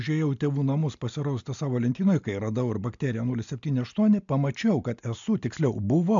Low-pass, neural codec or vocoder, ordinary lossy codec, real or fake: 7.2 kHz; none; MP3, 64 kbps; real